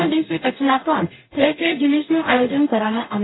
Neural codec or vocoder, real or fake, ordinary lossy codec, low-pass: codec, 44.1 kHz, 0.9 kbps, DAC; fake; AAC, 16 kbps; 7.2 kHz